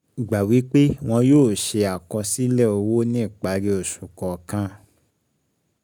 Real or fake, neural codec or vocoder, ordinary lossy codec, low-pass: real; none; none; none